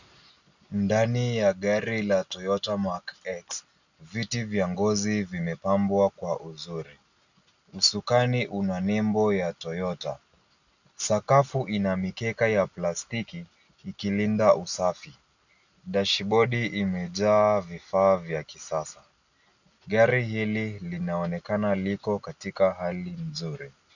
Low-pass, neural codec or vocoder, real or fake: 7.2 kHz; none; real